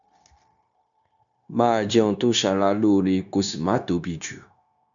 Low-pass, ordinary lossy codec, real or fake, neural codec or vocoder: 7.2 kHz; none; fake; codec, 16 kHz, 0.9 kbps, LongCat-Audio-Codec